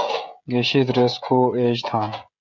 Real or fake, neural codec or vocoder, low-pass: fake; codec, 16 kHz, 16 kbps, FreqCodec, smaller model; 7.2 kHz